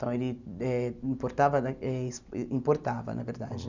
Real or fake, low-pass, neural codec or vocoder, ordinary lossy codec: real; 7.2 kHz; none; none